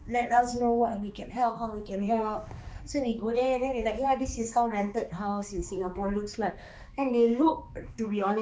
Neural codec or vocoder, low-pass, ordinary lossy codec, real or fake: codec, 16 kHz, 2 kbps, X-Codec, HuBERT features, trained on balanced general audio; none; none; fake